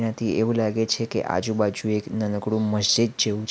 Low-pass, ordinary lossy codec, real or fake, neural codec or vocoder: none; none; real; none